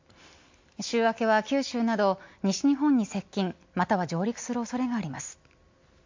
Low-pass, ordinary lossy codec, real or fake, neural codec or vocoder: 7.2 kHz; MP3, 48 kbps; real; none